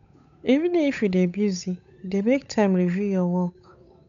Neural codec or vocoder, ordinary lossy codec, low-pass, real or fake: codec, 16 kHz, 8 kbps, FunCodec, trained on Chinese and English, 25 frames a second; none; 7.2 kHz; fake